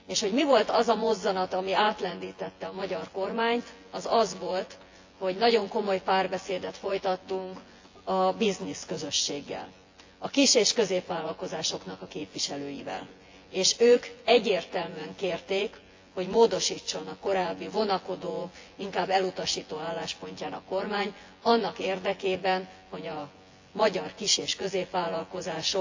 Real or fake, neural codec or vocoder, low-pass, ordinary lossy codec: fake; vocoder, 24 kHz, 100 mel bands, Vocos; 7.2 kHz; AAC, 48 kbps